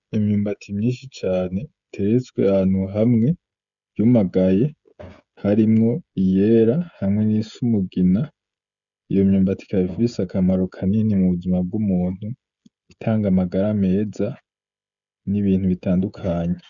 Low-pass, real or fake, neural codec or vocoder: 7.2 kHz; fake; codec, 16 kHz, 16 kbps, FreqCodec, smaller model